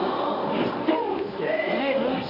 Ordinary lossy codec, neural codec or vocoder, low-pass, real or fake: none; codec, 24 kHz, 0.9 kbps, WavTokenizer, medium speech release version 1; 5.4 kHz; fake